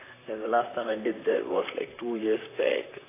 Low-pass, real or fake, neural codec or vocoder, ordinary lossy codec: 3.6 kHz; fake; vocoder, 44.1 kHz, 128 mel bands, Pupu-Vocoder; AAC, 16 kbps